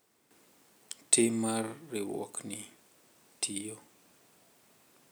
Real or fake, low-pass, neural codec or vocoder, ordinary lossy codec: real; none; none; none